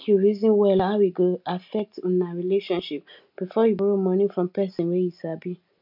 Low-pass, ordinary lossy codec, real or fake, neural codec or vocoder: 5.4 kHz; MP3, 48 kbps; real; none